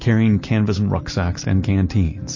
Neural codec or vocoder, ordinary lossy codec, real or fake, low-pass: none; MP3, 32 kbps; real; 7.2 kHz